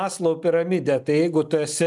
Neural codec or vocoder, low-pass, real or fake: none; 10.8 kHz; real